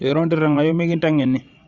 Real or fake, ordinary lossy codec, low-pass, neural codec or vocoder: fake; Opus, 64 kbps; 7.2 kHz; vocoder, 22.05 kHz, 80 mel bands, WaveNeXt